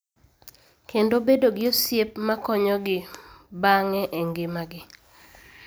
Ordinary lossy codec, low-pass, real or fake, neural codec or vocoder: none; none; real; none